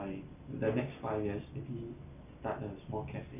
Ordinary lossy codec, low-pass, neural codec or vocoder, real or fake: none; 3.6 kHz; none; real